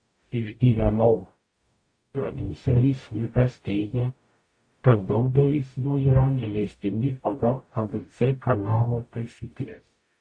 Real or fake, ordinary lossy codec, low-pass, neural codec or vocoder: fake; AAC, 48 kbps; 9.9 kHz; codec, 44.1 kHz, 0.9 kbps, DAC